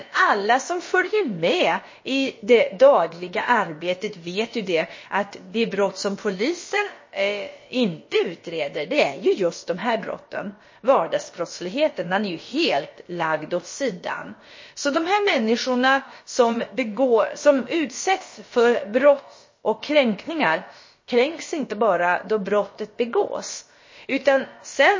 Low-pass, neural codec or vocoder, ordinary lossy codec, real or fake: 7.2 kHz; codec, 16 kHz, about 1 kbps, DyCAST, with the encoder's durations; MP3, 32 kbps; fake